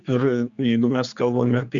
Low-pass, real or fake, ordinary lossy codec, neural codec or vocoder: 7.2 kHz; fake; Opus, 64 kbps; codec, 16 kHz, 4 kbps, X-Codec, HuBERT features, trained on general audio